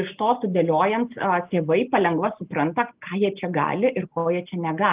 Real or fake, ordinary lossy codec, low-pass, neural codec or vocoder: real; Opus, 32 kbps; 3.6 kHz; none